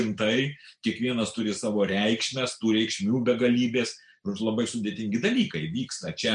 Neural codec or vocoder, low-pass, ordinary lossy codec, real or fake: none; 9.9 kHz; MP3, 96 kbps; real